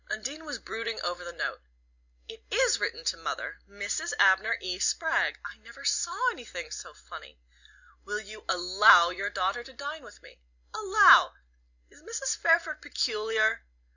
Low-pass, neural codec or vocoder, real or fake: 7.2 kHz; none; real